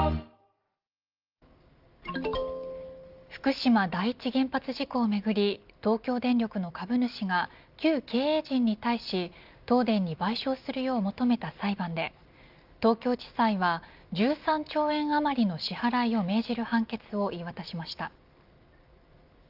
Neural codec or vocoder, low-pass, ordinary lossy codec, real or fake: none; 5.4 kHz; Opus, 24 kbps; real